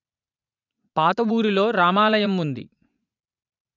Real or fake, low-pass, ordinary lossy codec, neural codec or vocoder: fake; 7.2 kHz; none; vocoder, 44.1 kHz, 80 mel bands, Vocos